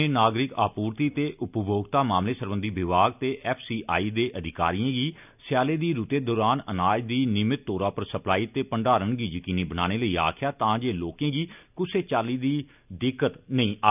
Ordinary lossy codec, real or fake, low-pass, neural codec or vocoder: none; real; 3.6 kHz; none